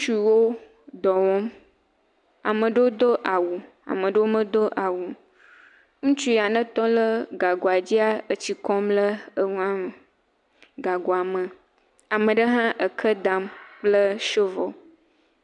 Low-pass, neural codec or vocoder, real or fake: 10.8 kHz; none; real